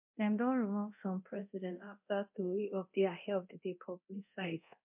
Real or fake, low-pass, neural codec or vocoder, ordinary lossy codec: fake; 3.6 kHz; codec, 24 kHz, 0.9 kbps, DualCodec; MP3, 32 kbps